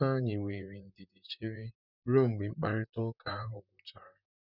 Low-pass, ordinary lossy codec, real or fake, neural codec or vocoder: 5.4 kHz; none; fake; vocoder, 44.1 kHz, 128 mel bands, Pupu-Vocoder